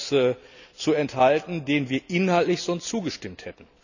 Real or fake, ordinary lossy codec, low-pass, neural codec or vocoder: real; none; 7.2 kHz; none